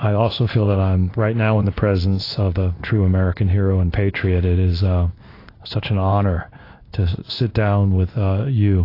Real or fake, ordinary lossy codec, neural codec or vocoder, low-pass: fake; AAC, 32 kbps; codec, 16 kHz in and 24 kHz out, 1 kbps, XY-Tokenizer; 5.4 kHz